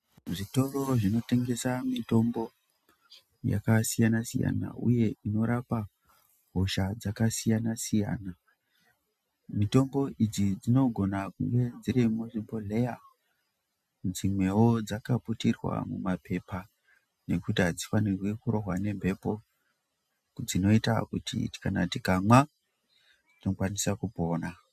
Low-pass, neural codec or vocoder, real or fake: 14.4 kHz; none; real